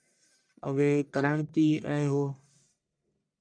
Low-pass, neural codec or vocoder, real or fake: 9.9 kHz; codec, 44.1 kHz, 1.7 kbps, Pupu-Codec; fake